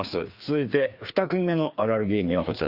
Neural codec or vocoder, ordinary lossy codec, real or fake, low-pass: codec, 16 kHz, 2 kbps, FreqCodec, larger model; Opus, 64 kbps; fake; 5.4 kHz